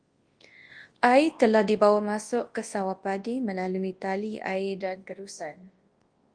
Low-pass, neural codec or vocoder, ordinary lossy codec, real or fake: 9.9 kHz; codec, 24 kHz, 0.9 kbps, WavTokenizer, large speech release; Opus, 24 kbps; fake